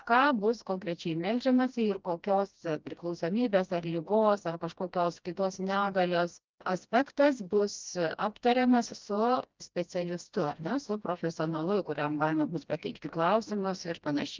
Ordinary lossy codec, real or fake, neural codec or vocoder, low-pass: Opus, 24 kbps; fake; codec, 16 kHz, 1 kbps, FreqCodec, smaller model; 7.2 kHz